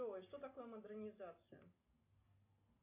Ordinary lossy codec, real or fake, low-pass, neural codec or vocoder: MP3, 24 kbps; real; 3.6 kHz; none